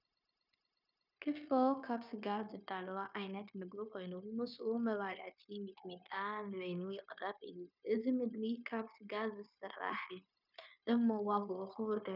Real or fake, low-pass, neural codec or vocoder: fake; 5.4 kHz; codec, 16 kHz, 0.9 kbps, LongCat-Audio-Codec